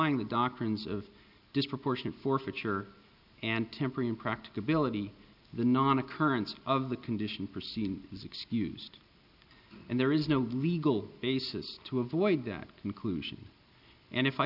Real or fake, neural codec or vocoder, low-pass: real; none; 5.4 kHz